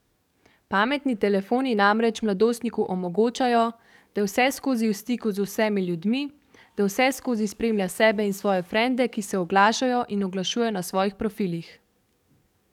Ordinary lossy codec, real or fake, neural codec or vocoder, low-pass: none; fake; codec, 44.1 kHz, 7.8 kbps, DAC; 19.8 kHz